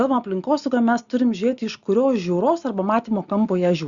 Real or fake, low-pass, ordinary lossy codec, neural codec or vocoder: real; 7.2 kHz; Opus, 64 kbps; none